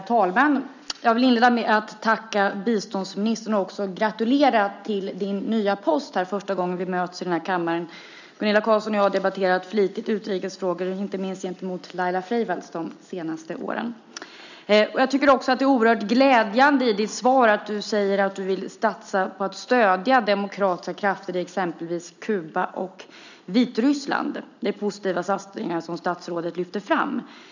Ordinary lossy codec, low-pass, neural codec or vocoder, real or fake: none; 7.2 kHz; none; real